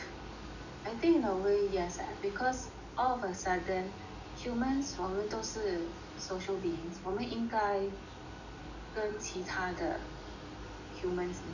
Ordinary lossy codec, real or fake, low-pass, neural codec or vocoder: none; real; 7.2 kHz; none